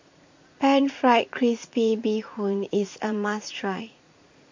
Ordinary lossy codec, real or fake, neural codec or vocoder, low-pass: MP3, 48 kbps; fake; vocoder, 44.1 kHz, 80 mel bands, Vocos; 7.2 kHz